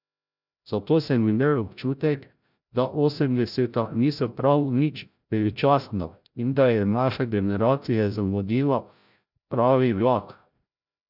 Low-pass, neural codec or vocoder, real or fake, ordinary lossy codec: 5.4 kHz; codec, 16 kHz, 0.5 kbps, FreqCodec, larger model; fake; none